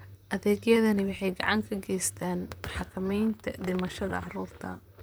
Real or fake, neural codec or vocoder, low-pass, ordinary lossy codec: fake; vocoder, 44.1 kHz, 128 mel bands, Pupu-Vocoder; none; none